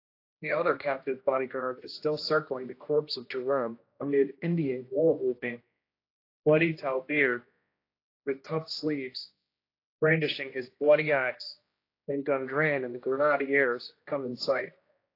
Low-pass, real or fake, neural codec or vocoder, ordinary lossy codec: 5.4 kHz; fake; codec, 16 kHz, 1 kbps, X-Codec, HuBERT features, trained on general audio; AAC, 32 kbps